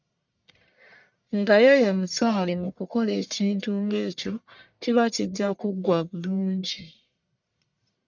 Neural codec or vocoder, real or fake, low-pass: codec, 44.1 kHz, 1.7 kbps, Pupu-Codec; fake; 7.2 kHz